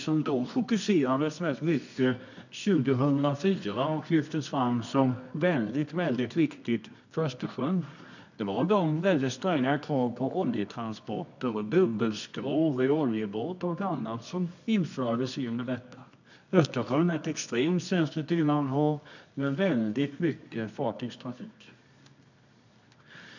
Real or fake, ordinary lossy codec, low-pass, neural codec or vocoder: fake; none; 7.2 kHz; codec, 24 kHz, 0.9 kbps, WavTokenizer, medium music audio release